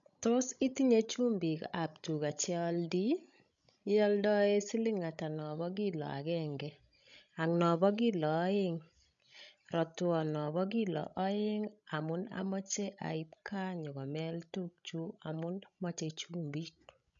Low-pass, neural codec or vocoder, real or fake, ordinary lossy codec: 7.2 kHz; codec, 16 kHz, 16 kbps, FreqCodec, larger model; fake; none